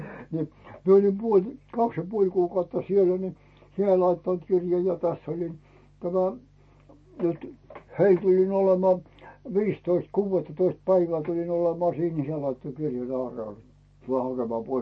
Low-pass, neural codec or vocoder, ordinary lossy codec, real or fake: 9.9 kHz; none; MP3, 32 kbps; real